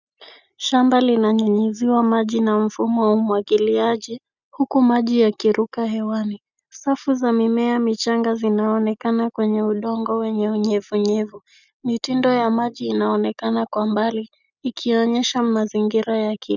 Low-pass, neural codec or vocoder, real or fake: 7.2 kHz; none; real